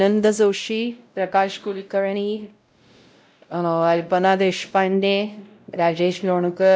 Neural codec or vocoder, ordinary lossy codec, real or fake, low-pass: codec, 16 kHz, 0.5 kbps, X-Codec, WavLM features, trained on Multilingual LibriSpeech; none; fake; none